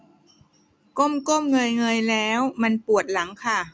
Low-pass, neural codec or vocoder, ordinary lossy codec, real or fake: none; none; none; real